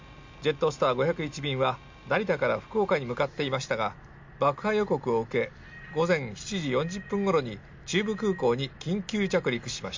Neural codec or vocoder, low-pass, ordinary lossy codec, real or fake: none; 7.2 kHz; MP3, 48 kbps; real